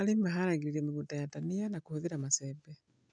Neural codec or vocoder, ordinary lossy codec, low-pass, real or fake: none; none; 9.9 kHz; real